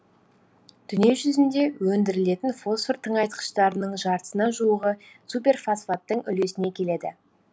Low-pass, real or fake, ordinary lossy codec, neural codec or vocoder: none; real; none; none